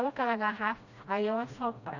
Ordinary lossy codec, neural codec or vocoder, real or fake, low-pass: none; codec, 16 kHz, 1 kbps, FreqCodec, smaller model; fake; 7.2 kHz